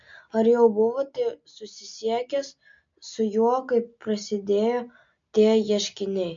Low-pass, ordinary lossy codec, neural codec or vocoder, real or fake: 7.2 kHz; MP3, 48 kbps; none; real